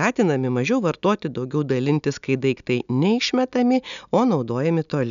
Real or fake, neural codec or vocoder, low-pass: real; none; 7.2 kHz